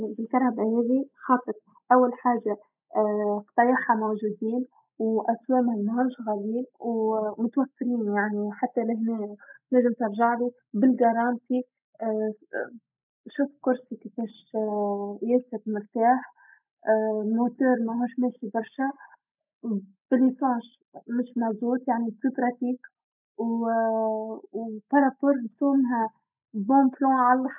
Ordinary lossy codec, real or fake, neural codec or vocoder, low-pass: none; real; none; 3.6 kHz